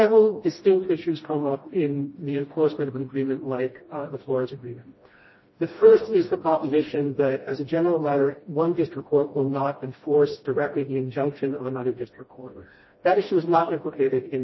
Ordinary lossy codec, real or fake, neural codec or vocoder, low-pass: MP3, 24 kbps; fake; codec, 16 kHz, 1 kbps, FreqCodec, smaller model; 7.2 kHz